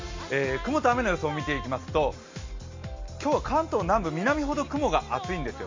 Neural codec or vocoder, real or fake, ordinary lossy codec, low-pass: none; real; MP3, 48 kbps; 7.2 kHz